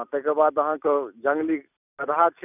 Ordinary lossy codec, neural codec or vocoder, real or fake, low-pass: none; none; real; 3.6 kHz